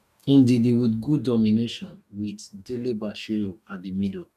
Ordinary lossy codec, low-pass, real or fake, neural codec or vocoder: none; 14.4 kHz; fake; codec, 44.1 kHz, 2.6 kbps, DAC